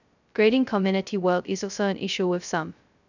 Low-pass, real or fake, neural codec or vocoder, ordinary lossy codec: 7.2 kHz; fake; codec, 16 kHz, 0.2 kbps, FocalCodec; none